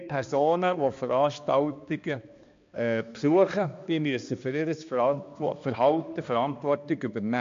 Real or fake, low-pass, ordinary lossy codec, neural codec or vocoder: fake; 7.2 kHz; MP3, 48 kbps; codec, 16 kHz, 2 kbps, X-Codec, HuBERT features, trained on balanced general audio